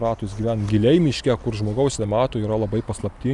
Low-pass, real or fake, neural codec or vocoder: 10.8 kHz; real; none